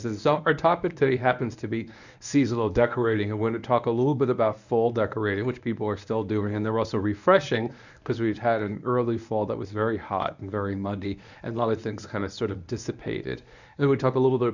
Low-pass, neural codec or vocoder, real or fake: 7.2 kHz; codec, 24 kHz, 0.9 kbps, WavTokenizer, medium speech release version 1; fake